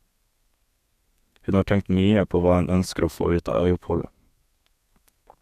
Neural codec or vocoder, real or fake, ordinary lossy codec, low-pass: codec, 32 kHz, 1.9 kbps, SNAC; fake; none; 14.4 kHz